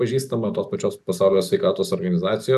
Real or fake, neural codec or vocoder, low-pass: real; none; 14.4 kHz